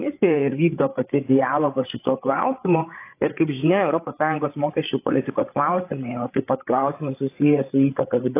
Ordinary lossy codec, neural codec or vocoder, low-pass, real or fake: AAC, 24 kbps; codec, 16 kHz, 8 kbps, FreqCodec, larger model; 3.6 kHz; fake